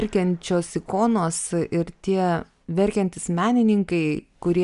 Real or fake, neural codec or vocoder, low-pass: real; none; 10.8 kHz